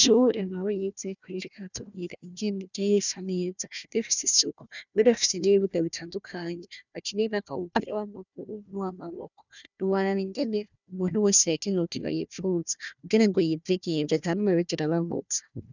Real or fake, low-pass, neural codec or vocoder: fake; 7.2 kHz; codec, 16 kHz, 1 kbps, FunCodec, trained on Chinese and English, 50 frames a second